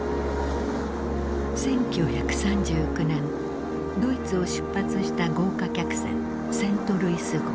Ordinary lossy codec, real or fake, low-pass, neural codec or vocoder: none; real; none; none